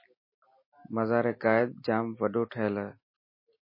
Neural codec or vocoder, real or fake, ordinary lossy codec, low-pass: none; real; MP3, 24 kbps; 5.4 kHz